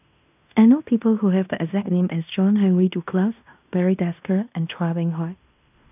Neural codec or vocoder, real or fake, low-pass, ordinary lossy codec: codec, 16 kHz in and 24 kHz out, 0.9 kbps, LongCat-Audio-Codec, fine tuned four codebook decoder; fake; 3.6 kHz; none